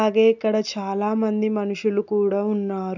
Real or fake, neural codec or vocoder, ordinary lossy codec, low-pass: real; none; none; 7.2 kHz